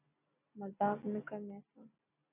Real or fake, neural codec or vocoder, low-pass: real; none; 3.6 kHz